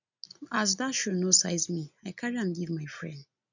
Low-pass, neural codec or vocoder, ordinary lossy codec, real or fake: 7.2 kHz; none; none; real